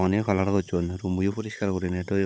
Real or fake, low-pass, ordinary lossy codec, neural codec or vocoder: fake; none; none; codec, 16 kHz, 16 kbps, FunCodec, trained on Chinese and English, 50 frames a second